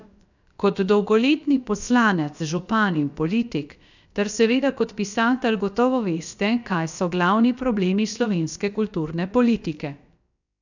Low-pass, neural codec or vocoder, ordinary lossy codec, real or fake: 7.2 kHz; codec, 16 kHz, about 1 kbps, DyCAST, with the encoder's durations; none; fake